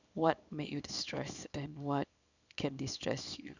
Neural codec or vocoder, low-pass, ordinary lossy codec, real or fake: codec, 24 kHz, 0.9 kbps, WavTokenizer, small release; 7.2 kHz; none; fake